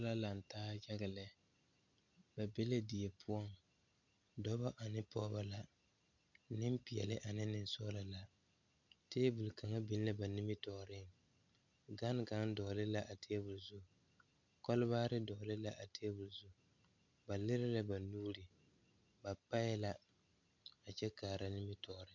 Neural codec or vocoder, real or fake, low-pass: none; real; 7.2 kHz